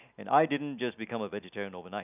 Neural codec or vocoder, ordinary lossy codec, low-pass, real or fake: none; none; 3.6 kHz; real